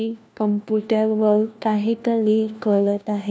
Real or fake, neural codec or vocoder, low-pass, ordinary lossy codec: fake; codec, 16 kHz, 1 kbps, FunCodec, trained on LibriTTS, 50 frames a second; none; none